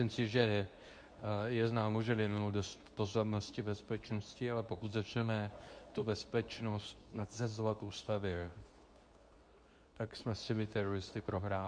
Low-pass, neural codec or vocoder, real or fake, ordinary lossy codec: 9.9 kHz; codec, 24 kHz, 0.9 kbps, WavTokenizer, medium speech release version 2; fake; MP3, 64 kbps